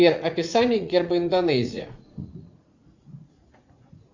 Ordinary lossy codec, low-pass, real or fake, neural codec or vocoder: Opus, 64 kbps; 7.2 kHz; fake; vocoder, 44.1 kHz, 80 mel bands, Vocos